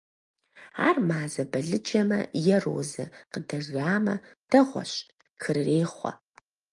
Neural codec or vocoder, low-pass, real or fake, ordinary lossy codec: none; 10.8 kHz; real; Opus, 32 kbps